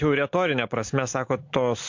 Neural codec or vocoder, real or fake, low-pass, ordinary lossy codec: none; real; 7.2 kHz; MP3, 48 kbps